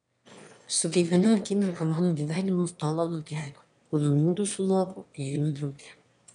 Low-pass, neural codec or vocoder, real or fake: 9.9 kHz; autoencoder, 22.05 kHz, a latent of 192 numbers a frame, VITS, trained on one speaker; fake